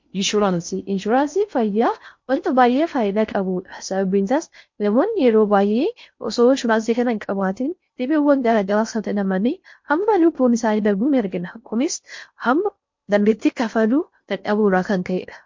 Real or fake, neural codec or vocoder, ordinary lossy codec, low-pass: fake; codec, 16 kHz in and 24 kHz out, 0.6 kbps, FocalCodec, streaming, 4096 codes; MP3, 48 kbps; 7.2 kHz